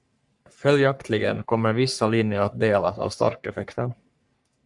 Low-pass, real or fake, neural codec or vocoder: 10.8 kHz; fake; codec, 44.1 kHz, 3.4 kbps, Pupu-Codec